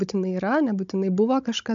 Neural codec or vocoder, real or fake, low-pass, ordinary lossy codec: codec, 16 kHz, 16 kbps, FunCodec, trained on Chinese and English, 50 frames a second; fake; 7.2 kHz; MP3, 64 kbps